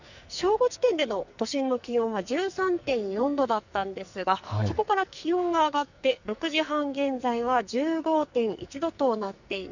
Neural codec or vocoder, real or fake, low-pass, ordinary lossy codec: codec, 44.1 kHz, 2.6 kbps, SNAC; fake; 7.2 kHz; none